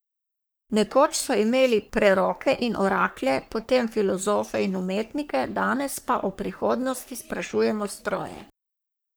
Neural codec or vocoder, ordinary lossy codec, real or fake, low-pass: codec, 44.1 kHz, 3.4 kbps, Pupu-Codec; none; fake; none